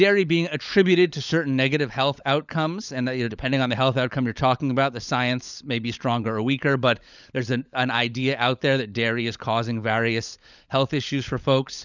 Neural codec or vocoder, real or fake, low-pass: codec, 16 kHz, 16 kbps, FunCodec, trained on LibriTTS, 50 frames a second; fake; 7.2 kHz